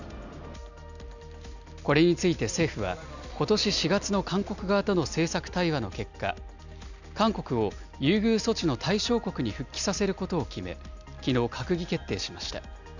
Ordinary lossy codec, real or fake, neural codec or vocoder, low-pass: none; real; none; 7.2 kHz